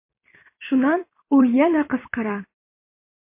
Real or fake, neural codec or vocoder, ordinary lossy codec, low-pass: fake; vocoder, 44.1 kHz, 128 mel bands every 256 samples, BigVGAN v2; MP3, 24 kbps; 3.6 kHz